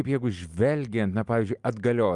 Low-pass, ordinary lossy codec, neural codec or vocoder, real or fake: 10.8 kHz; Opus, 32 kbps; none; real